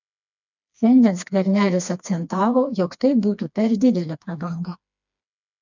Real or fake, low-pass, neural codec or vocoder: fake; 7.2 kHz; codec, 16 kHz, 2 kbps, FreqCodec, smaller model